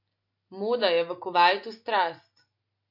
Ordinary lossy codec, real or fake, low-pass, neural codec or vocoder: MP3, 32 kbps; real; 5.4 kHz; none